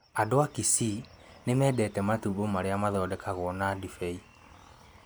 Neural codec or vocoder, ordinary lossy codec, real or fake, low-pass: none; none; real; none